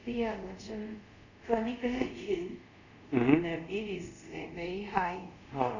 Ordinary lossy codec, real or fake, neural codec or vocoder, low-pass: none; fake; codec, 24 kHz, 0.5 kbps, DualCodec; 7.2 kHz